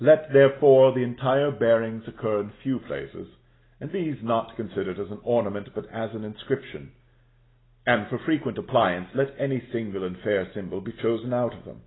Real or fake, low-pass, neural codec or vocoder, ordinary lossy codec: real; 7.2 kHz; none; AAC, 16 kbps